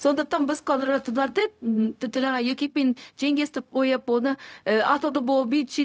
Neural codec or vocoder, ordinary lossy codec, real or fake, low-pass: codec, 16 kHz, 0.4 kbps, LongCat-Audio-Codec; none; fake; none